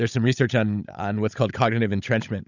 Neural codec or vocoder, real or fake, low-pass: codec, 16 kHz, 16 kbps, FunCodec, trained on Chinese and English, 50 frames a second; fake; 7.2 kHz